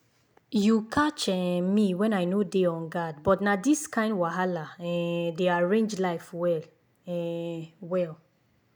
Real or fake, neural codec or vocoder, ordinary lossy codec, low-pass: real; none; none; none